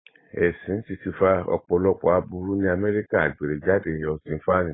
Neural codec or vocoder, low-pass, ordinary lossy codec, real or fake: vocoder, 44.1 kHz, 128 mel bands every 512 samples, BigVGAN v2; 7.2 kHz; AAC, 16 kbps; fake